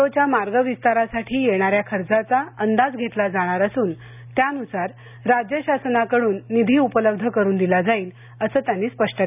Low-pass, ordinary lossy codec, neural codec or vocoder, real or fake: 3.6 kHz; none; none; real